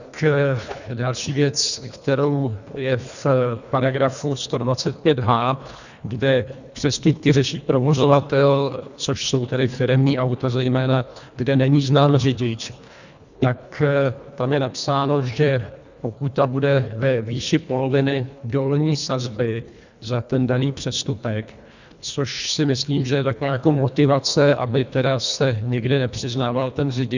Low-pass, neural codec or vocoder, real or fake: 7.2 kHz; codec, 24 kHz, 1.5 kbps, HILCodec; fake